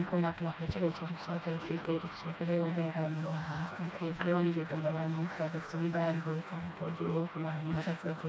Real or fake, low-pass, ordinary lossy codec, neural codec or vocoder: fake; none; none; codec, 16 kHz, 1 kbps, FreqCodec, smaller model